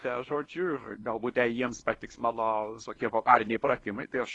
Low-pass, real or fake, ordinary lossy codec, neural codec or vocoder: 10.8 kHz; fake; AAC, 32 kbps; codec, 24 kHz, 0.9 kbps, WavTokenizer, small release